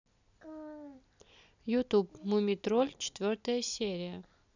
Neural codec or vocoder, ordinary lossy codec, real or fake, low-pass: none; none; real; 7.2 kHz